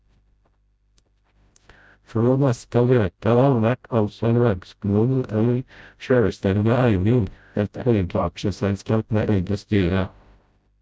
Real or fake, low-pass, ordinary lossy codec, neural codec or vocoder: fake; none; none; codec, 16 kHz, 0.5 kbps, FreqCodec, smaller model